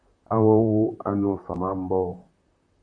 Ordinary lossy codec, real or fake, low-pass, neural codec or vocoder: MP3, 64 kbps; fake; 9.9 kHz; vocoder, 44.1 kHz, 128 mel bands, Pupu-Vocoder